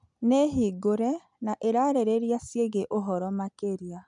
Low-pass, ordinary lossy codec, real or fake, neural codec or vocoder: 10.8 kHz; MP3, 96 kbps; real; none